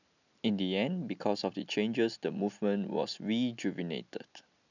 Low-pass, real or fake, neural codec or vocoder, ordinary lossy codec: 7.2 kHz; real; none; none